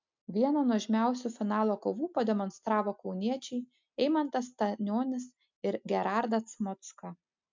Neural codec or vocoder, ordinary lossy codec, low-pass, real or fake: none; MP3, 64 kbps; 7.2 kHz; real